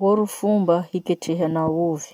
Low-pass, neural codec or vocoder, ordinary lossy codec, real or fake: 19.8 kHz; none; none; real